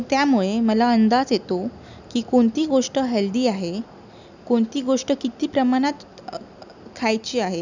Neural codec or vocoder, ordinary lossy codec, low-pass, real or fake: none; none; 7.2 kHz; real